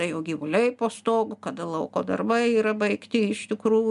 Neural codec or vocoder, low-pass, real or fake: none; 10.8 kHz; real